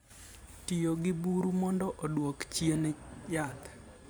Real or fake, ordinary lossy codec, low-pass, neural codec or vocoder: fake; none; none; vocoder, 44.1 kHz, 128 mel bands every 512 samples, BigVGAN v2